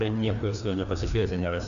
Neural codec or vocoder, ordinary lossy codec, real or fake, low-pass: codec, 16 kHz, 2 kbps, FreqCodec, larger model; MP3, 96 kbps; fake; 7.2 kHz